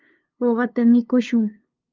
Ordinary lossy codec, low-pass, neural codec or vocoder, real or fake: Opus, 32 kbps; 7.2 kHz; codec, 16 kHz, 2 kbps, FunCodec, trained on LibriTTS, 25 frames a second; fake